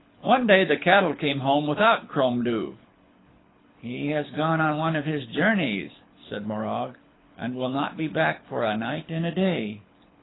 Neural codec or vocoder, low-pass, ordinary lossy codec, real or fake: codec, 24 kHz, 6 kbps, HILCodec; 7.2 kHz; AAC, 16 kbps; fake